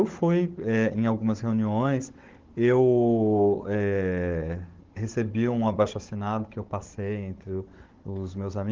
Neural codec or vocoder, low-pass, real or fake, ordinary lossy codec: codec, 16 kHz, 16 kbps, FunCodec, trained on Chinese and English, 50 frames a second; 7.2 kHz; fake; Opus, 16 kbps